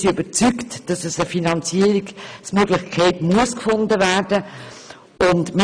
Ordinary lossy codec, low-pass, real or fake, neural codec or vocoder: none; 9.9 kHz; real; none